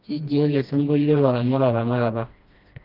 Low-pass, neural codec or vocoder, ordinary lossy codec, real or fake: 5.4 kHz; codec, 16 kHz, 1 kbps, FreqCodec, smaller model; Opus, 32 kbps; fake